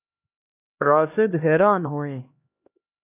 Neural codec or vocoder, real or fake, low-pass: codec, 16 kHz, 1 kbps, X-Codec, HuBERT features, trained on LibriSpeech; fake; 3.6 kHz